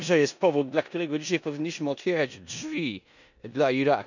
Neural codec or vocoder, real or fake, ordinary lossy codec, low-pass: codec, 16 kHz in and 24 kHz out, 0.9 kbps, LongCat-Audio-Codec, four codebook decoder; fake; none; 7.2 kHz